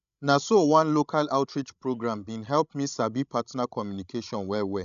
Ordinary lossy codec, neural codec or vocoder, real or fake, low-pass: none; codec, 16 kHz, 16 kbps, FreqCodec, larger model; fake; 7.2 kHz